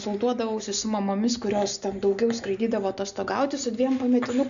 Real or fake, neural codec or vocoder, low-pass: real; none; 7.2 kHz